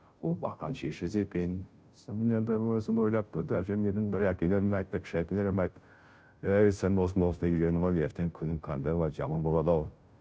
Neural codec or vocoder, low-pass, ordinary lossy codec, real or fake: codec, 16 kHz, 0.5 kbps, FunCodec, trained on Chinese and English, 25 frames a second; none; none; fake